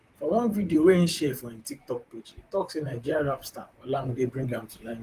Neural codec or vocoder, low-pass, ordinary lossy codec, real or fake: vocoder, 44.1 kHz, 128 mel bands, Pupu-Vocoder; 14.4 kHz; Opus, 24 kbps; fake